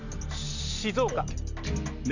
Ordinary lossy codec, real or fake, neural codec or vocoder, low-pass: none; real; none; 7.2 kHz